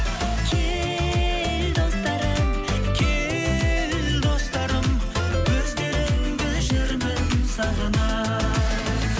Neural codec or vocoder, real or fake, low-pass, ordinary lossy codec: none; real; none; none